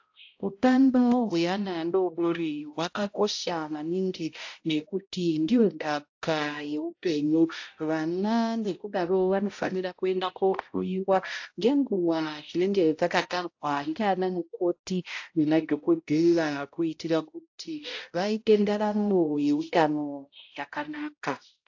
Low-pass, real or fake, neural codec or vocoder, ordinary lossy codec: 7.2 kHz; fake; codec, 16 kHz, 0.5 kbps, X-Codec, HuBERT features, trained on balanced general audio; AAC, 48 kbps